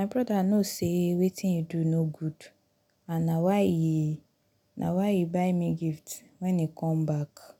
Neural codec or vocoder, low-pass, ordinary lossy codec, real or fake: none; 19.8 kHz; none; real